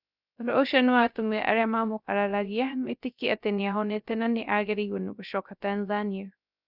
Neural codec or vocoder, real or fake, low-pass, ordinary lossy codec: codec, 16 kHz, 0.3 kbps, FocalCodec; fake; 5.4 kHz; none